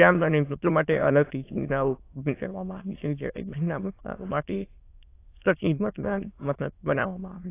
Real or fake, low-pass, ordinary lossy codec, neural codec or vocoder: fake; 3.6 kHz; AAC, 24 kbps; autoencoder, 22.05 kHz, a latent of 192 numbers a frame, VITS, trained on many speakers